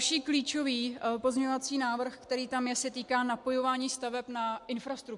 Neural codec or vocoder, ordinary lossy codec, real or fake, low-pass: none; MP3, 64 kbps; real; 10.8 kHz